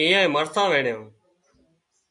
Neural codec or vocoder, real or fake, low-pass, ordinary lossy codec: none; real; 10.8 kHz; MP3, 64 kbps